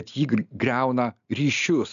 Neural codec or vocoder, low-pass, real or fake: none; 7.2 kHz; real